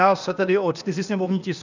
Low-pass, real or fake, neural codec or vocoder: 7.2 kHz; fake; codec, 16 kHz, 0.8 kbps, ZipCodec